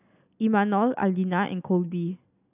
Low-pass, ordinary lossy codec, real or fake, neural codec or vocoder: 3.6 kHz; none; real; none